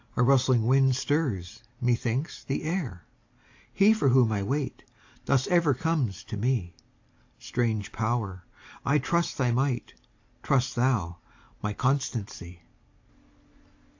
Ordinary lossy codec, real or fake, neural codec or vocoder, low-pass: AAC, 48 kbps; real; none; 7.2 kHz